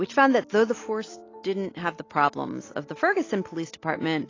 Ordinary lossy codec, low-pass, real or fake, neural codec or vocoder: AAC, 32 kbps; 7.2 kHz; real; none